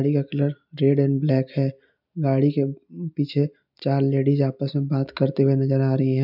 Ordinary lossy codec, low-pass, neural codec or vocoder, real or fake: none; 5.4 kHz; none; real